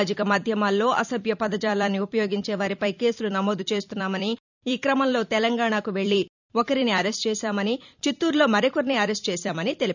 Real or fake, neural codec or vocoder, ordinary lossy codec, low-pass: fake; vocoder, 44.1 kHz, 128 mel bands every 256 samples, BigVGAN v2; none; 7.2 kHz